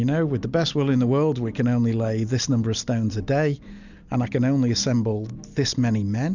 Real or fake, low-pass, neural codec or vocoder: real; 7.2 kHz; none